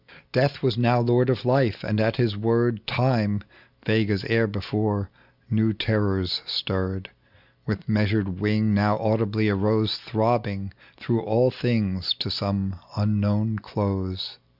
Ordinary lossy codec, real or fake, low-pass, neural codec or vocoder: Opus, 64 kbps; real; 5.4 kHz; none